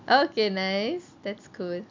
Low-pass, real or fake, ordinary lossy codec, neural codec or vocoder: 7.2 kHz; real; MP3, 64 kbps; none